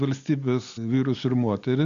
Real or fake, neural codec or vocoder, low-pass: real; none; 7.2 kHz